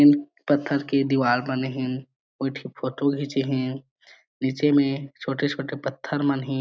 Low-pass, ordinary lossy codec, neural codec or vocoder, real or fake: none; none; none; real